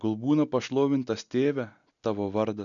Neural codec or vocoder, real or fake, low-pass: none; real; 7.2 kHz